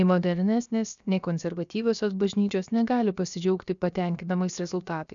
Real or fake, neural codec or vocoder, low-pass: fake; codec, 16 kHz, about 1 kbps, DyCAST, with the encoder's durations; 7.2 kHz